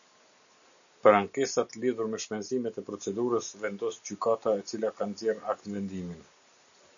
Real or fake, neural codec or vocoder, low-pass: real; none; 7.2 kHz